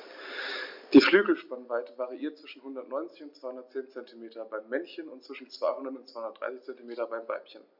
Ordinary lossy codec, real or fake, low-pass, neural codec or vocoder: MP3, 48 kbps; real; 5.4 kHz; none